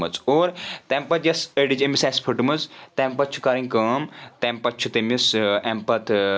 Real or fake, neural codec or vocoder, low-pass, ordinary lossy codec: real; none; none; none